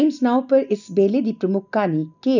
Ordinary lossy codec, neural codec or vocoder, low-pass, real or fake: none; none; 7.2 kHz; real